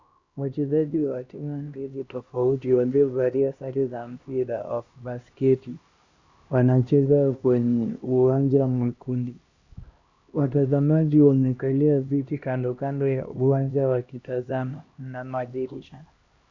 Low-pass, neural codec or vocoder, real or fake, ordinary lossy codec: 7.2 kHz; codec, 16 kHz, 1 kbps, X-Codec, HuBERT features, trained on LibriSpeech; fake; Opus, 64 kbps